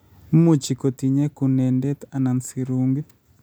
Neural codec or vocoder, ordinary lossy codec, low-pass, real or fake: none; none; none; real